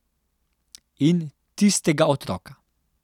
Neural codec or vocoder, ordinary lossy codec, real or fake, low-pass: none; none; real; 19.8 kHz